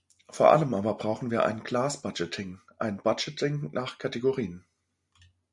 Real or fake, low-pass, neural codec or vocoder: real; 10.8 kHz; none